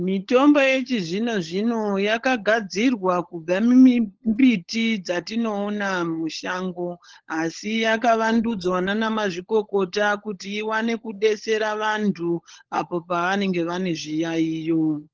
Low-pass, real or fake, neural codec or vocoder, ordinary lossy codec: 7.2 kHz; fake; codec, 16 kHz, 16 kbps, FunCodec, trained on LibriTTS, 50 frames a second; Opus, 16 kbps